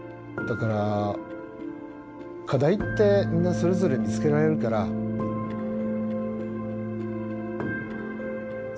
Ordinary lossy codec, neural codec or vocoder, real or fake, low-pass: none; none; real; none